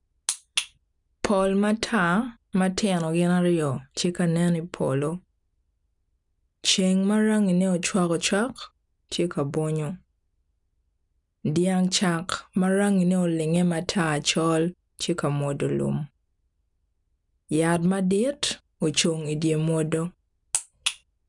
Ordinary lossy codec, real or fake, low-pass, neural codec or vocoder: none; real; 10.8 kHz; none